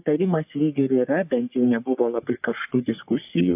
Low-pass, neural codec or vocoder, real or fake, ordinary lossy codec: 3.6 kHz; codec, 44.1 kHz, 3.4 kbps, Pupu-Codec; fake; AAC, 32 kbps